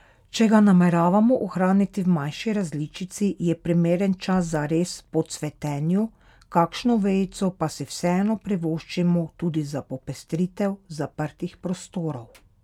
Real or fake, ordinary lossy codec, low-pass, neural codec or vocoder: real; none; 19.8 kHz; none